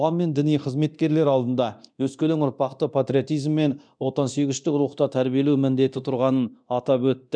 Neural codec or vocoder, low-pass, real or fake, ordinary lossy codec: codec, 24 kHz, 0.9 kbps, DualCodec; 9.9 kHz; fake; none